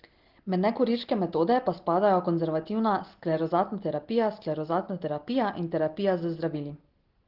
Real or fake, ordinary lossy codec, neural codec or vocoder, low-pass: real; Opus, 16 kbps; none; 5.4 kHz